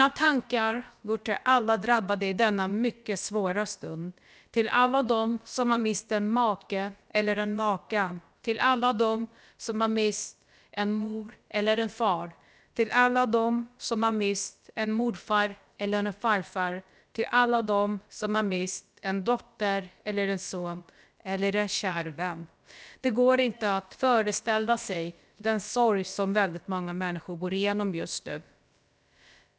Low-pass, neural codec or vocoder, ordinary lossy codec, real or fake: none; codec, 16 kHz, about 1 kbps, DyCAST, with the encoder's durations; none; fake